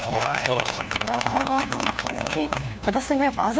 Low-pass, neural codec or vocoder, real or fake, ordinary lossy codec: none; codec, 16 kHz, 1 kbps, FunCodec, trained on LibriTTS, 50 frames a second; fake; none